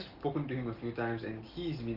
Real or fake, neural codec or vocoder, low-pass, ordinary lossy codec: real; none; 5.4 kHz; Opus, 16 kbps